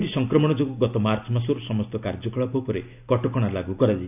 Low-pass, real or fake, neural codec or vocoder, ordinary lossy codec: 3.6 kHz; real; none; none